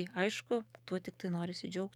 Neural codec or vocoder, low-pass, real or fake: codec, 44.1 kHz, 7.8 kbps, DAC; 19.8 kHz; fake